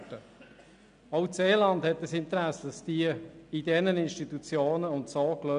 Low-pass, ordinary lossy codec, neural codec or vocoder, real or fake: 9.9 kHz; none; none; real